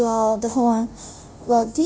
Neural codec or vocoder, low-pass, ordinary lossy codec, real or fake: codec, 16 kHz, 0.5 kbps, FunCodec, trained on Chinese and English, 25 frames a second; none; none; fake